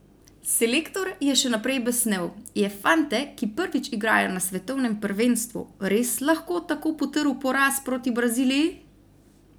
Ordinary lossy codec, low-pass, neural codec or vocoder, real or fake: none; none; none; real